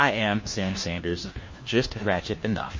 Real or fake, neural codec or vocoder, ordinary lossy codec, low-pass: fake; codec, 16 kHz, 1 kbps, FunCodec, trained on LibriTTS, 50 frames a second; MP3, 32 kbps; 7.2 kHz